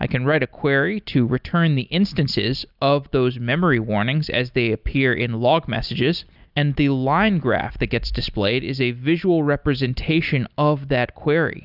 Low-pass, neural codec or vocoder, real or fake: 5.4 kHz; none; real